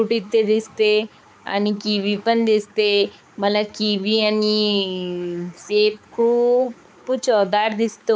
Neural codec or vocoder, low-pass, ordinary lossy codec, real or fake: codec, 16 kHz, 4 kbps, X-Codec, HuBERT features, trained on balanced general audio; none; none; fake